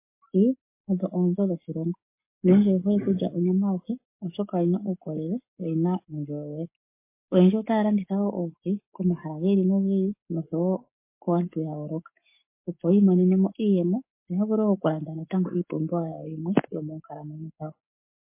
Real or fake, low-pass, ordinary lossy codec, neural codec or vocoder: fake; 3.6 kHz; MP3, 24 kbps; codec, 44.1 kHz, 7.8 kbps, Pupu-Codec